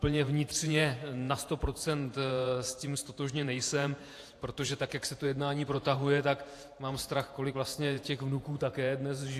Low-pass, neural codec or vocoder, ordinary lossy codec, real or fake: 14.4 kHz; vocoder, 48 kHz, 128 mel bands, Vocos; AAC, 64 kbps; fake